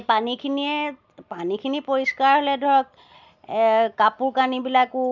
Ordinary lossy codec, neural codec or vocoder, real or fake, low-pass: none; none; real; 7.2 kHz